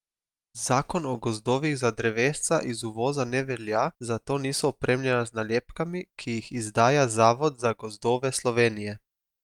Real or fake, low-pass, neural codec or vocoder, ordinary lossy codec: real; 19.8 kHz; none; Opus, 32 kbps